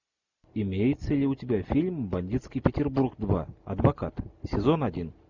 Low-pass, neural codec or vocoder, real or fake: 7.2 kHz; none; real